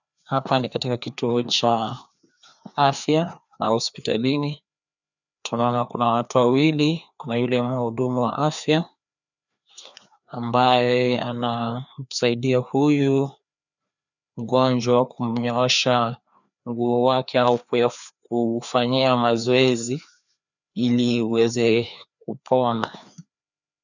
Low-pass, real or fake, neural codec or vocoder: 7.2 kHz; fake; codec, 16 kHz, 2 kbps, FreqCodec, larger model